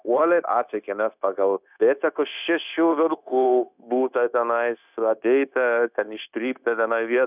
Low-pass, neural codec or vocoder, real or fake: 3.6 kHz; codec, 16 kHz, 0.9 kbps, LongCat-Audio-Codec; fake